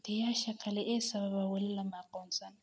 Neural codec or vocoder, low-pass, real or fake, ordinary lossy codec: none; none; real; none